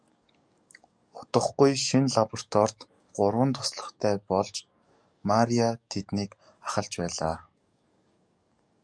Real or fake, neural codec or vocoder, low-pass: fake; codec, 44.1 kHz, 7.8 kbps, DAC; 9.9 kHz